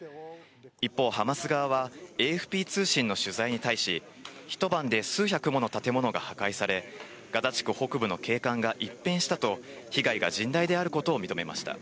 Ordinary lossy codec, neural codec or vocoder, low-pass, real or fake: none; none; none; real